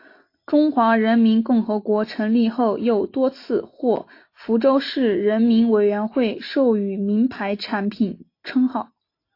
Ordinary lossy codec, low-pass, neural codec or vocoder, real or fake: AAC, 32 kbps; 5.4 kHz; none; real